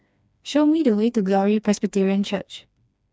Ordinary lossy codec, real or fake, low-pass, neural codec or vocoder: none; fake; none; codec, 16 kHz, 2 kbps, FreqCodec, smaller model